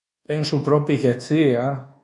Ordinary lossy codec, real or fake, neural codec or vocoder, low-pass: none; fake; codec, 24 kHz, 1.2 kbps, DualCodec; 10.8 kHz